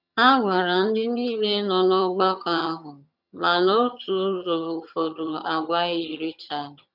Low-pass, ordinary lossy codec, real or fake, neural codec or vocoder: 5.4 kHz; none; fake; vocoder, 22.05 kHz, 80 mel bands, HiFi-GAN